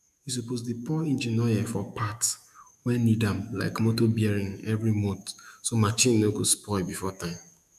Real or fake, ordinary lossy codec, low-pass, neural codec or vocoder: fake; none; 14.4 kHz; autoencoder, 48 kHz, 128 numbers a frame, DAC-VAE, trained on Japanese speech